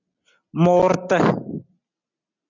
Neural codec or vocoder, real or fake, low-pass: none; real; 7.2 kHz